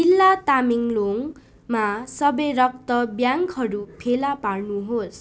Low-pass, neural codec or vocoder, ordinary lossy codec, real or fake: none; none; none; real